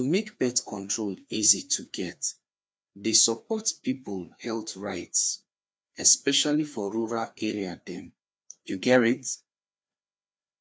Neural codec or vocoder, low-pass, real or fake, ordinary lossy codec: codec, 16 kHz, 2 kbps, FreqCodec, larger model; none; fake; none